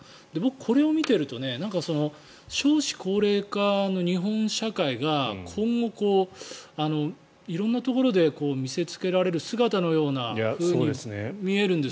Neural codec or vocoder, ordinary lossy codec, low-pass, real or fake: none; none; none; real